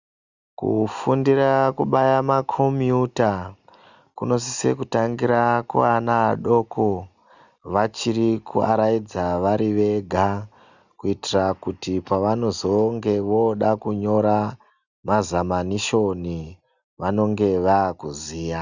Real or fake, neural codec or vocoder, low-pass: real; none; 7.2 kHz